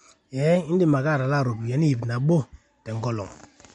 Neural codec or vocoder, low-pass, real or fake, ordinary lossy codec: none; 19.8 kHz; real; MP3, 48 kbps